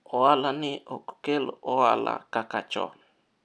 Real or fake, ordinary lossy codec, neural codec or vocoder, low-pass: real; none; none; none